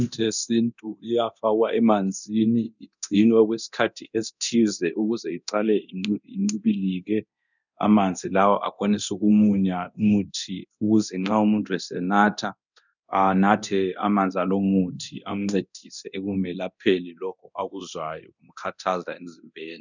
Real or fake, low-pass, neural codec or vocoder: fake; 7.2 kHz; codec, 24 kHz, 0.9 kbps, DualCodec